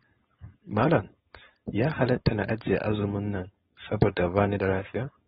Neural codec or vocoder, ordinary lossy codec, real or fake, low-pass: codec, 16 kHz, 4.8 kbps, FACodec; AAC, 16 kbps; fake; 7.2 kHz